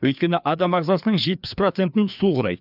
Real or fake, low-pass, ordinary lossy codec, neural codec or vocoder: fake; 5.4 kHz; none; codec, 16 kHz, 2 kbps, FreqCodec, larger model